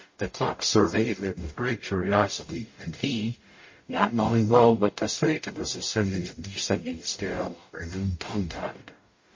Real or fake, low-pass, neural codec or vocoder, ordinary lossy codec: fake; 7.2 kHz; codec, 44.1 kHz, 0.9 kbps, DAC; MP3, 32 kbps